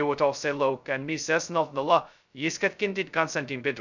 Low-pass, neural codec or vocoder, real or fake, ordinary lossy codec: 7.2 kHz; codec, 16 kHz, 0.2 kbps, FocalCodec; fake; none